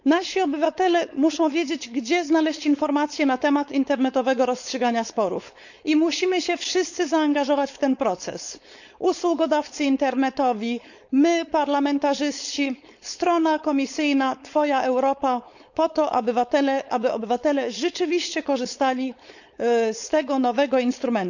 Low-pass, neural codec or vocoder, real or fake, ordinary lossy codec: 7.2 kHz; codec, 16 kHz, 4.8 kbps, FACodec; fake; none